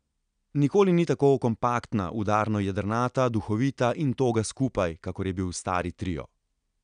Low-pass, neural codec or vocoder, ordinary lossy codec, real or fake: 9.9 kHz; none; none; real